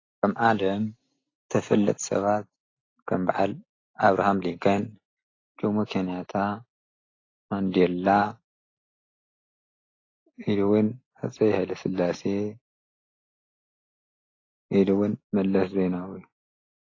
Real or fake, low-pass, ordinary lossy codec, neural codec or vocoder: real; 7.2 kHz; AAC, 32 kbps; none